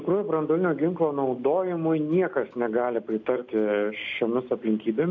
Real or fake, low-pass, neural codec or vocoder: real; 7.2 kHz; none